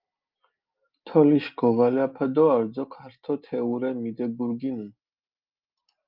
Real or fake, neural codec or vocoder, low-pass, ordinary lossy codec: real; none; 5.4 kHz; Opus, 24 kbps